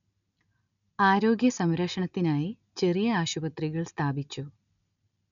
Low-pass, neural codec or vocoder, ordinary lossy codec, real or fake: 7.2 kHz; none; none; real